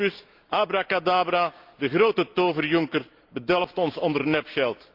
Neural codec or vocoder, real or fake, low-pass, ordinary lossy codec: none; real; 5.4 kHz; Opus, 24 kbps